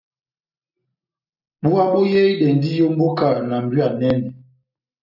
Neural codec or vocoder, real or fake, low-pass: none; real; 5.4 kHz